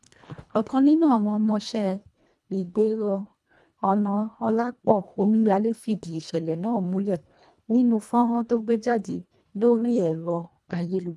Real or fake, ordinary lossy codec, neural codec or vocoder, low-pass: fake; none; codec, 24 kHz, 1.5 kbps, HILCodec; none